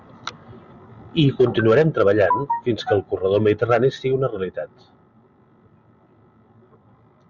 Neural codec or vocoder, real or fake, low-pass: none; real; 7.2 kHz